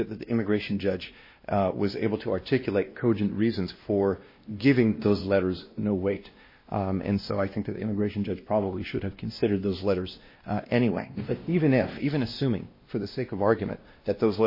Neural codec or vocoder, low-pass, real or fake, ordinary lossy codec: codec, 16 kHz, 1 kbps, X-Codec, WavLM features, trained on Multilingual LibriSpeech; 5.4 kHz; fake; MP3, 24 kbps